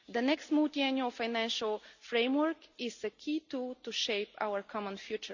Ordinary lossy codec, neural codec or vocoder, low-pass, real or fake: Opus, 64 kbps; none; 7.2 kHz; real